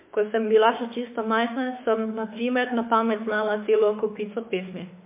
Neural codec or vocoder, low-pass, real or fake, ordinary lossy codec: autoencoder, 48 kHz, 32 numbers a frame, DAC-VAE, trained on Japanese speech; 3.6 kHz; fake; MP3, 24 kbps